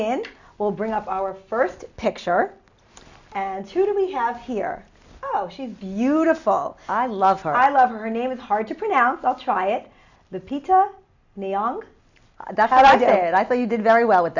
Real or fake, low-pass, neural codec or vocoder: real; 7.2 kHz; none